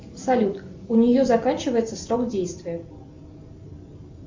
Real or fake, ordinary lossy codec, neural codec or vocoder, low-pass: real; MP3, 64 kbps; none; 7.2 kHz